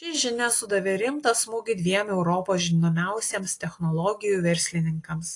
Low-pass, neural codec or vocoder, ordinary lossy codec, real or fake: 10.8 kHz; none; AAC, 48 kbps; real